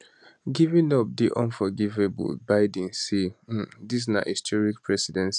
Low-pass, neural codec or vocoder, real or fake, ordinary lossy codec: 10.8 kHz; none; real; none